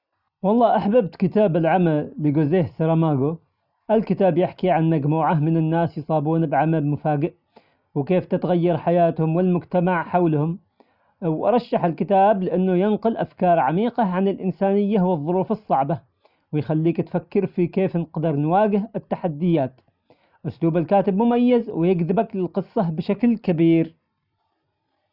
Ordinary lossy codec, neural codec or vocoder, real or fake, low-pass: none; none; real; 5.4 kHz